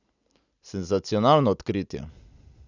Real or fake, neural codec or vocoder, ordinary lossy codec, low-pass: real; none; none; 7.2 kHz